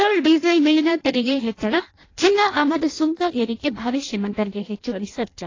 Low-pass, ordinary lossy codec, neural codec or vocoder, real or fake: 7.2 kHz; AAC, 32 kbps; codec, 16 kHz in and 24 kHz out, 0.6 kbps, FireRedTTS-2 codec; fake